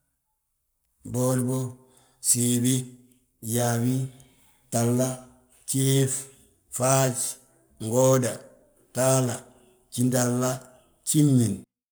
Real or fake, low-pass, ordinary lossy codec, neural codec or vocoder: fake; none; none; codec, 44.1 kHz, 7.8 kbps, Pupu-Codec